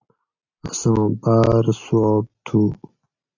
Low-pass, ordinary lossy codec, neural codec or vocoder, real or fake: 7.2 kHz; AAC, 48 kbps; none; real